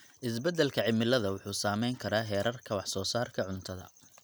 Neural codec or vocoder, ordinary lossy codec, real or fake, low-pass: none; none; real; none